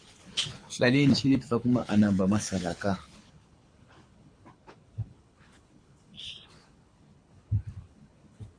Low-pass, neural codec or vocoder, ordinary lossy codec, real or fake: 9.9 kHz; codec, 24 kHz, 6 kbps, HILCodec; MP3, 48 kbps; fake